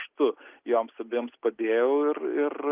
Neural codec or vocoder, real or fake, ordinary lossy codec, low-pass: none; real; Opus, 32 kbps; 3.6 kHz